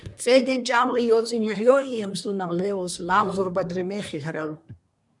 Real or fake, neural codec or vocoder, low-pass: fake; codec, 24 kHz, 1 kbps, SNAC; 10.8 kHz